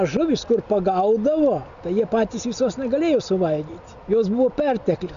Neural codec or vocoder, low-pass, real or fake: none; 7.2 kHz; real